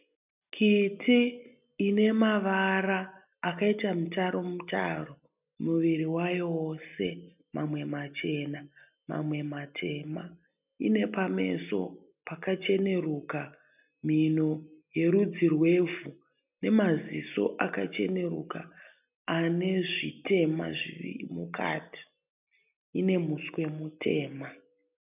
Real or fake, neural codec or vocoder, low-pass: real; none; 3.6 kHz